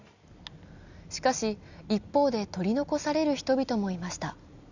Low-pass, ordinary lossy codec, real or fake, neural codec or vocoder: 7.2 kHz; none; real; none